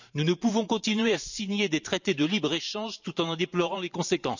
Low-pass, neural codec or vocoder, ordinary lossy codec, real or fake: 7.2 kHz; vocoder, 44.1 kHz, 128 mel bands every 512 samples, BigVGAN v2; none; fake